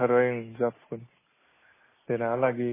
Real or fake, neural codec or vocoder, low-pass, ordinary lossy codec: real; none; 3.6 kHz; MP3, 16 kbps